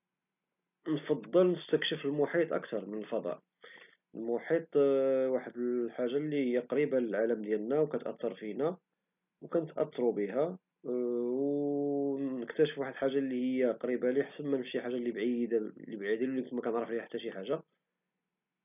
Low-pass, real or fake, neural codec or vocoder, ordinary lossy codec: 3.6 kHz; real; none; none